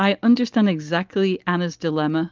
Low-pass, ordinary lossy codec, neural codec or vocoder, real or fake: 7.2 kHz; Opus, 24 kbps; none; real